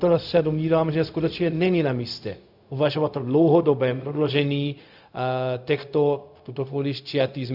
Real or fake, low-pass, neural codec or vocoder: fake; 5.4 kHz; codec, 16 kHz, 0.4 kbps, LongCat-Audio-Codec